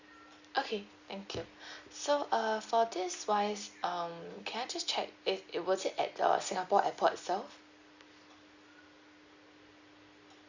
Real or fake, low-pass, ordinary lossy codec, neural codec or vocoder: real; 7.2 kHz; Opus, 64 kbps; none